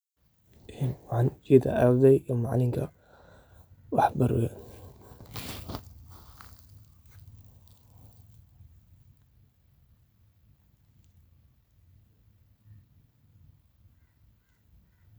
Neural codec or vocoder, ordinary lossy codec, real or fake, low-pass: none; none; real; none